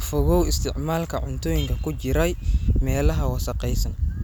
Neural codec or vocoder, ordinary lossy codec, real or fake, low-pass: none; none; real; none